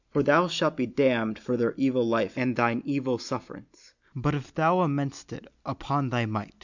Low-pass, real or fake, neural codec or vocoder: 7.2 kHz; real; none